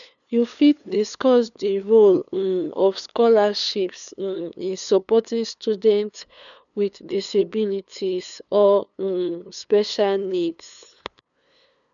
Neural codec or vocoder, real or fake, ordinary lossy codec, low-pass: codec, 16 kHz, 2 kbps, FunCodec, trained on LibriTTS, 25 frames a second; fake; none; 7.2 kHz